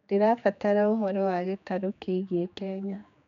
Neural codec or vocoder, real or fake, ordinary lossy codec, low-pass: codec, 16 kHz, 2 kbps, X-Codec, HuBERT features, trained on general audio; fake; none; 7.2 kHz